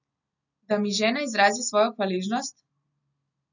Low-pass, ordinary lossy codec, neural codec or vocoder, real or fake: 7.2 kHz; none; none; real